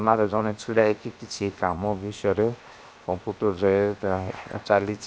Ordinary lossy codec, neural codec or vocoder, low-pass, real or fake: none; codec, 16 kHz, 0.7 kbps, FocalCodec; none; fake